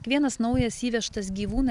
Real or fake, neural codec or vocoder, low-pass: real; none; 10.8 kHz